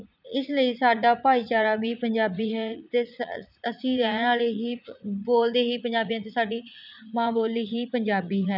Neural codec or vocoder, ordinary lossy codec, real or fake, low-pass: vocoder, 44.1 kHz, 80 mel bands, Vocos; none; fake; 5.4 kHz